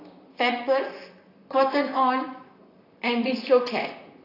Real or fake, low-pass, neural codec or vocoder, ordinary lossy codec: fake; 5.4 kHz; vocoder, 44.1 kHz, 128 mel bands, Pupu-Vocoder; AAC, 32 kbps